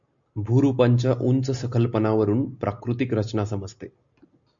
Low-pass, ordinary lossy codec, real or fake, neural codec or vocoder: 7.2 kHz; MP3, 64 kbps; real; none